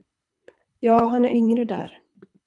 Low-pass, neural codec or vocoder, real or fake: 10.8 kHz; codec, 24 kHz, 3 kbps, HILCodec; fake